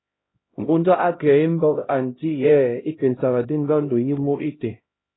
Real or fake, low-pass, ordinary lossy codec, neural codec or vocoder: fake; 7.2 kHz; AAC, 16 kbps; codec, 16 kHz, 0.5 kbps, X-Codec, HuBERT features, trained on LibriSpeech